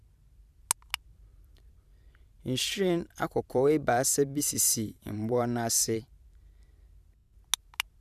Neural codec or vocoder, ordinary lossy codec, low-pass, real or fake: vocoder, 44.1 kHz, 128 mel bands every 512 samples, BigVGAN v2; none; 14.4 kHz; fake